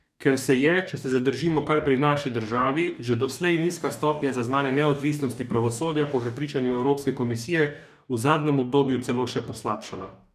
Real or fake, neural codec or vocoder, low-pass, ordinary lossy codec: fake; codec, 44.1 kHz, 2.6 kbps, DAC; 14.4 kHz; none